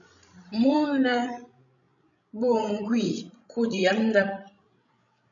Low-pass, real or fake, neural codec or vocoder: 7.2 kHz; fake; codec, 16 kHz, 16 kbps, FreqCodec, larger model